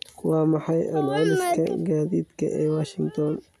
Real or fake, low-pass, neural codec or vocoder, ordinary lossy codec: real; 14.4 kHz; none; none